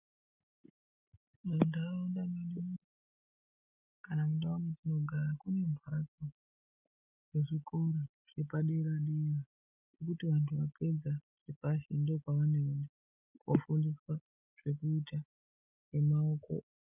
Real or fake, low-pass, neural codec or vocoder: real; 3.6 kHz; none